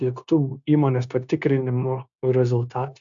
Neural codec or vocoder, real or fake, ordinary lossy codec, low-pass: codec, 16 kHz, 0.9 kbps, LongCat-Audio-Codec; fake; AAC, 64 kbps; 7.2 kHz